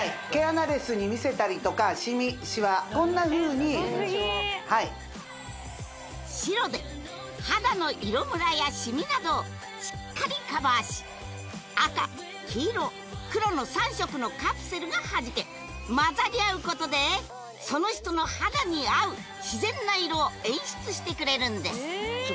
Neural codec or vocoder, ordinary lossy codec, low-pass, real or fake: none; none; none; real